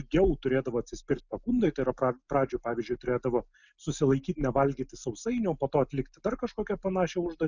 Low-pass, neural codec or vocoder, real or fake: 7.2 kHz; none; real